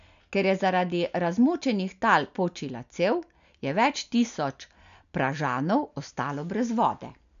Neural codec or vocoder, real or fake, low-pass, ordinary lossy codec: none; real; 7.2 kHz; MP3, 96 kbps